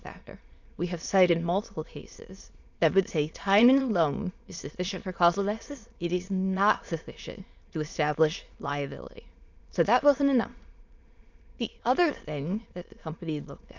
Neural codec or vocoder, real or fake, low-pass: autoencoder, 22.05 kHz, a latent of 192 numbers a frame, VITS, trained on many speakers; fake; 7.2 kHz